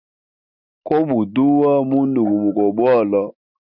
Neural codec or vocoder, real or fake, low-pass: none; real; 5.4 kHz